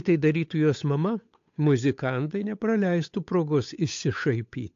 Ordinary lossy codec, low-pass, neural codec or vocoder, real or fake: AAC, 48 kbps; 7.2 kHz; codec, 16 kHz, 8 kbps, FunCodec, trained on Chinese and English, 25 frames a second; fake